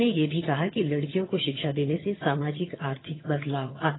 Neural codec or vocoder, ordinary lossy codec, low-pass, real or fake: vocoder, 22.05 kHz, 80 mel bands, HiFi-GAN; AAC, 16 kbps; 7.2 kHz; fake